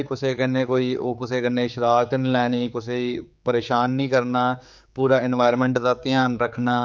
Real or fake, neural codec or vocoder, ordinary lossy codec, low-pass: fake; codec, 16 kHz, 4 kbps, X-Codec, HuBERT features, trained on general audio; none; none